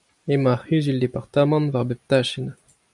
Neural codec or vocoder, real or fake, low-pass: none; real; 10.8 kHz